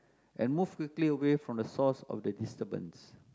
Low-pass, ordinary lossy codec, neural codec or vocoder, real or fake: none; none; none; real